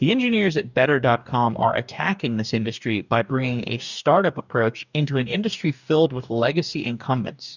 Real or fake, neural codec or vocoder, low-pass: fake; codec, 44.1 kHz, 2.6 kbps, DAC; 7.2 kHz